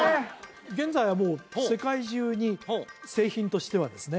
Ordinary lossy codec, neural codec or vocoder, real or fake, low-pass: none; none; real; none